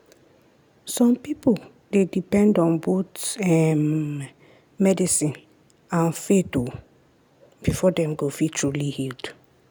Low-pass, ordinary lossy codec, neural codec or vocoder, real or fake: none; none; none; real